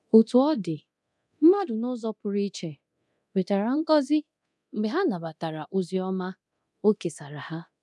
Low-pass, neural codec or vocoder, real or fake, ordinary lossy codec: none; codec, 24 kHz, 0.9 kbps, DualCodec; fake; none